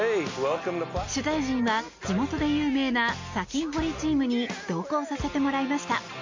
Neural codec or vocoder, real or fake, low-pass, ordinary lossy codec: none; real; 7.2 kHz; MP3, 48 kbps